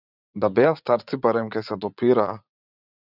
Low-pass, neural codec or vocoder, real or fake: 5.4 kHz; vocoder, 44.1 kHz, 80 mel bands, Vocos; fake